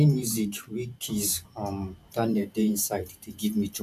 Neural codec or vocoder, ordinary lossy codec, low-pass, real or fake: vocoder, 44.1 kHz, 128 mel bands every 512 samples, BigVGAN v2; none; 14.4 kHz; fake